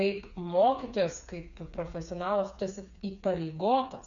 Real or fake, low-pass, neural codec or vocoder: fake; 7.2 kHz; codec, 16 kHz, 4 kbps, FreqCodec, smaller model